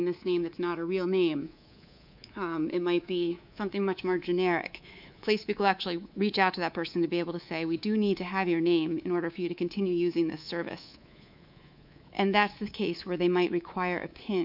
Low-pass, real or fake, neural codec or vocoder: 5.4 kHz; fake; codec, 24 kHz, 3.1 kbps, DualCodec